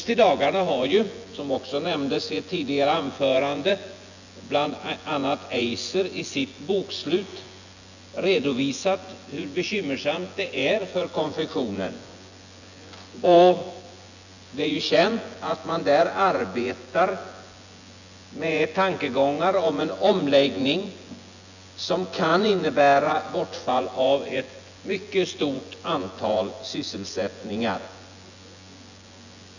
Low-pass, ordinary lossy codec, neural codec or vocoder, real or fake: 7.2 kHz; none; vocoder, 24 kHz, 100 mel bands, Vocos; fake